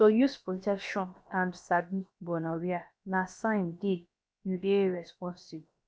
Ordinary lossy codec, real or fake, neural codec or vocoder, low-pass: none; fake; codec, 16 kHz, about 1 kbps, DyCAST, with the encoder's durations; none